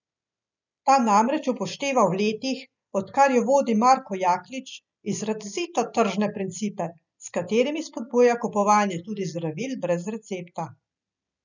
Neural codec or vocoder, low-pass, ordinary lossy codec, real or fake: none; 7.2 kHz; none; real